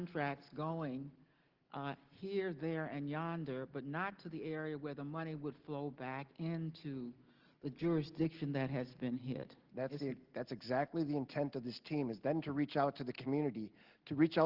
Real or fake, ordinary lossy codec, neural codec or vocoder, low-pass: real; Opus, 16 kbps; none; 5.4 kHz